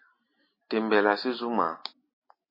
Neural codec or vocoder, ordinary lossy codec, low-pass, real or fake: none; MP3, 24 kbps; 5.4 kHz; real